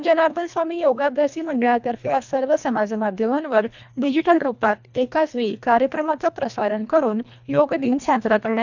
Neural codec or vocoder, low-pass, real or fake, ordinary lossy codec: codec, 24 kHz, 1.5 kbps, HILCodec; 7.2 kHz; fake; none